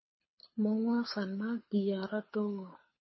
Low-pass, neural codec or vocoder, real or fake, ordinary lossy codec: 7.2 kHz; codec, 24 kHz, 6 kbps, HILCodec; fake; MP3, 24 kbps